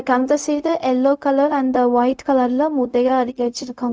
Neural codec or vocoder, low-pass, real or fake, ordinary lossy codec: codec, 16 kHz, 0.4 kbps, LongCat-Audio-Codec; none; fake; none